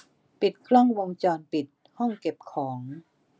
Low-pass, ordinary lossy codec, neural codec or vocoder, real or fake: none; none; none; real